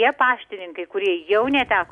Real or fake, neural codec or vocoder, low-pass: real; none; 10.8 kHz